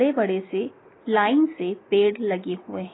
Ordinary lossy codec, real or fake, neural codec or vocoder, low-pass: AAC, 16 kbps; real; none; 7.2 kHz